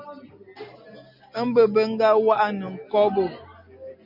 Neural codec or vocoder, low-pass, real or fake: none; 5.4 kHz; real